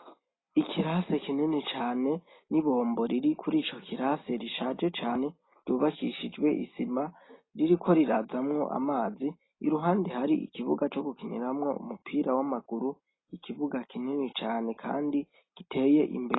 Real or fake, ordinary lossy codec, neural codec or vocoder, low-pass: real; AAC, 16 kbps; none; 7.2 kHz